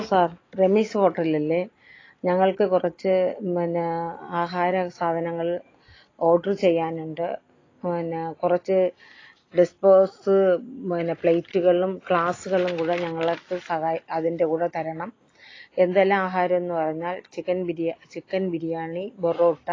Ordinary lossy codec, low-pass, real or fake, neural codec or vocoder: AAC, 32 kbps; 7.2 kHz; real; none